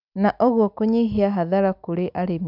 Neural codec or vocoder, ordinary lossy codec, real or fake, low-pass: none; none; real; 5.4 kHz